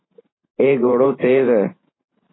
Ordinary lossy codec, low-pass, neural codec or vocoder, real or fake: AAC, 16 kbps; 7.2 kHz; vocoder, 44.1 kHz, 128 mel bands every 512 samples, BigVGAN v2; fake